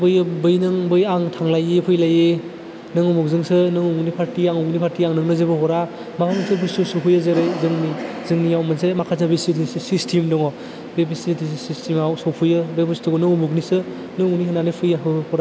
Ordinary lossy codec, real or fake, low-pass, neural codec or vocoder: none; real; none; none